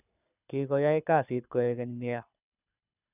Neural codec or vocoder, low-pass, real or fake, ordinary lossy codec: codec, 24 kHz, 6 kbps, HILCodec; 3.6 kHz; fake; none